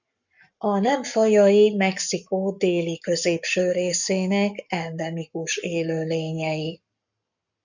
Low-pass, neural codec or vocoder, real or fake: 7.2 kHz; codec, 44.1 kHz, 7.8 kbps, Pupu-Codec; fake